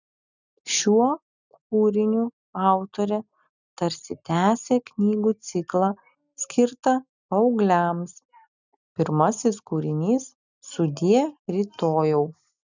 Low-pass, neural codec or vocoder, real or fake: 7.2 kHz; none; real